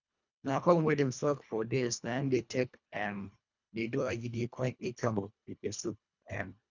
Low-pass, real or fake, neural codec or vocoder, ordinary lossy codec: 7.2 kHz; fake; codec, 24 kHz, 1.5 kbps, HILCodec; none